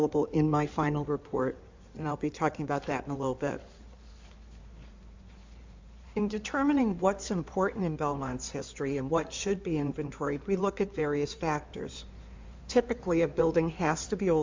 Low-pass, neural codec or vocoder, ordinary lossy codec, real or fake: 7.2 kHz; codec, 16 kHz in and 24 kHz out, 2.2 kbps, FireRedTTS-2 codec; MP3, 64 kbps; fake